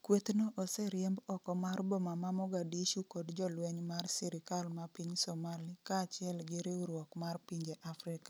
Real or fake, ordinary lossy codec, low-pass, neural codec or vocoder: real; none; none; none